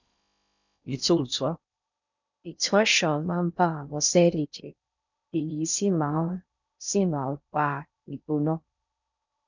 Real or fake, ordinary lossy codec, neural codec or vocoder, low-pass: fake; none; codec, 16 kHz in and 24 kHz out, 0.6 kbps, FocalCodec, streaming, 4096 codes; 7.2 kHz